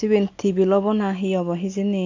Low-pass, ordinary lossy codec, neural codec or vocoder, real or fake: 7.2 kHz; AAC, 48 kbps; none; real